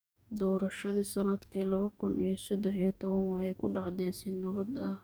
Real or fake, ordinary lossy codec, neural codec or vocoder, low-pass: fake; none; codec, 44.1 kHz, 2.6 kbps, DAC; none